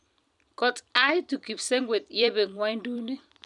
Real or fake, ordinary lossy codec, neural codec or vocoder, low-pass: fake; none; vocoder, 44.1 kHz, 128 mel bands every 256 samples, BigVGAN v2; 10.8 kHz